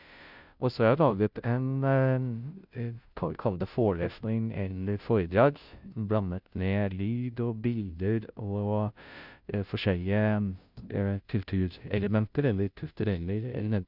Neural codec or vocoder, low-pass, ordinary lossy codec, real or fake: codec, 16 kHz, 0.5 kbps, FunCodec, trained on Chinese and English, 25 frames a second; 5.4 kHz; none; fake